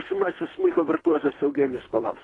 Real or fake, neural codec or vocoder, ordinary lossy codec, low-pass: fake; codec, 24 kHz, 3 kbps, HILCodec; AAC, 32 kbps; 10.8 kHz